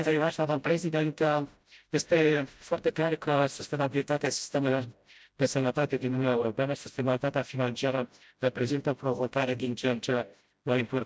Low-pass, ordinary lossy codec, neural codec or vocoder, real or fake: none; none; codec, 16 kHz, 0.5 kbps, FreqCodec, smaller model; fake